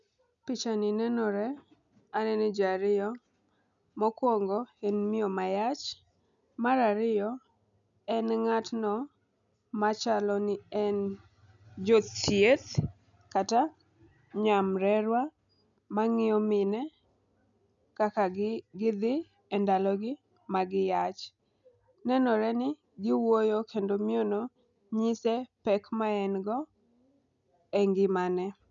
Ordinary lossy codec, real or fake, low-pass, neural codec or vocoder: none; real; 7.2 kHz; none